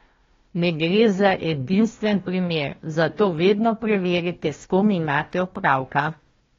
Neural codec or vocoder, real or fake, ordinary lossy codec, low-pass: codec, 16 kHz, 1 kbps, FunCodec, trained on Chinese and English, 50 frames a second; fake; AAC, 32 kbps; 7.2 kHz